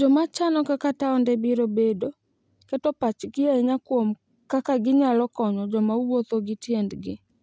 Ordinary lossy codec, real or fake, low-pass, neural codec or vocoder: none; real; none; none